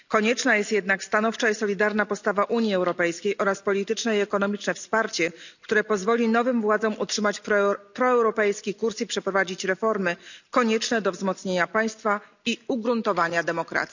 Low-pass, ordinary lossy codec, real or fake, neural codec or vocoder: 7.2 kHz; none; real; none